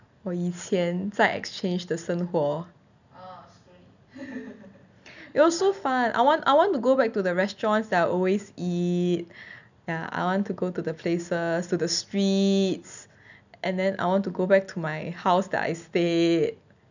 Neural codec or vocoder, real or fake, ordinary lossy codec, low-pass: none; real; none; 7.2 kHz